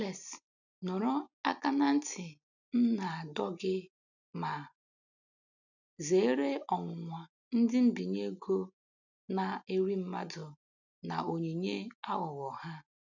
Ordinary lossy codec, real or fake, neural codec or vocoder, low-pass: none; real; none; 7.2 kHz